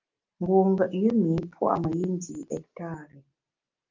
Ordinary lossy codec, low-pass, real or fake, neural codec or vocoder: Opus, 24 kbps; 7.2 kHz; real; none